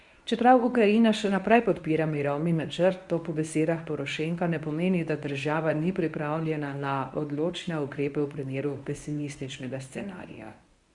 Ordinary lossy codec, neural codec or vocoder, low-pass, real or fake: Opus, 64 kbps; codec, 24 kHz, 0.9 kbps, WavTokenizer, medium speech release version 1; 10.8 kHz; fake